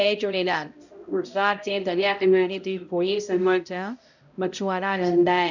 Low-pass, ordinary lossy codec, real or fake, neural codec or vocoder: 7.2 kHz; none; fake; codec, 16 kHz, 0.5 kbps, X-Codec, HuBERT features, trained on balanced general audio